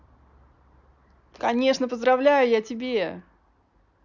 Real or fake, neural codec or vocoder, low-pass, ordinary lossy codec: real; none; 7.2 kHz; none